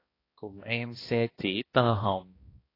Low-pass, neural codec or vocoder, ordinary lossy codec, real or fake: 5.4 kHz; codec, 16 kHz, 1 kbps, X-Codec, HuBERT features, trained on balanced general audio; AAC, 24 kbps; fake